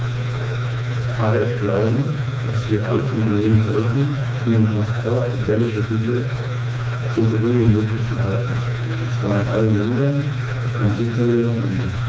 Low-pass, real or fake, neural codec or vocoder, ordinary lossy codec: none; fake; codec, 16 kHz, 2 kbps, FreqCodec, smaller model; none